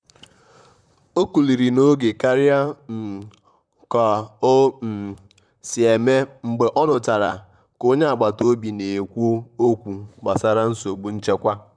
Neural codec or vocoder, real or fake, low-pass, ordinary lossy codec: vocoder, 44.1 kHz, 128 mel bands, Pupu-Vocoder; fake; 9.9 kHz; none